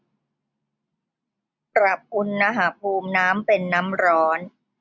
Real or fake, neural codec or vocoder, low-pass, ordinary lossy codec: real; none; none; none